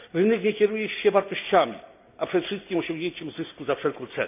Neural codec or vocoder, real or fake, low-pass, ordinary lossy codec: none; real; 3.6 kHz; none